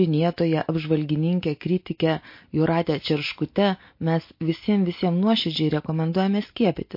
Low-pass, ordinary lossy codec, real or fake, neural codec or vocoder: 5.4 kHz; MP3, 32 kbps; real; none